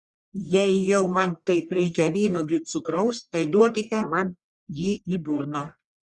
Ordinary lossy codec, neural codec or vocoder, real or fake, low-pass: Opus, 64 kbps; codec, 44.1 kHz, 1.7 kbps, Pupu-Codec; fake; 10.8 kHz